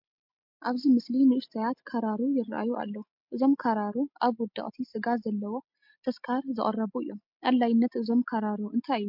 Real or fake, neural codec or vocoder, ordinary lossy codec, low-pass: real; none; MP3, 48 kbps; 5.4 kHz